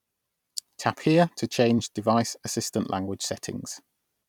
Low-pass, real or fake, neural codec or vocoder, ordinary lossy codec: 19.8 kHz; fake; vocoder, 44.1 kHz, 128 mel bands every 256 samples, BigVGAN v2; none